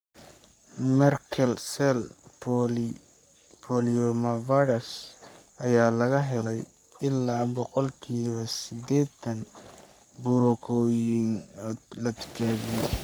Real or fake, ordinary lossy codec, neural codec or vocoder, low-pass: fake; none; codec, 44.1 kHz, 3.4 kbps, Pupu-Codec; none